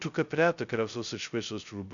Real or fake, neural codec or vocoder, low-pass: fake; codec, 16 kHz, 0.2 kbps, FocalCodec; 7.2 kHz